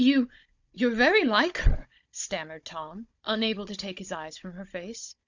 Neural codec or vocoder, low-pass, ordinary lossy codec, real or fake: codec, 16 kHz, 16 kbps, FunCodec, trained on Chinese and English, 50 frames a second; 7.2 kHz; Opus, 64 kbps; fake